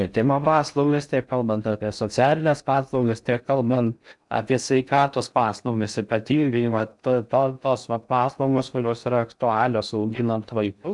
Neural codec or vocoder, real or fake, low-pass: codec, 16 kHz in and 24 kHz out, 0.6 kbps, FocalCodec, streaming, 4096 codes; fake; 10.8 kHz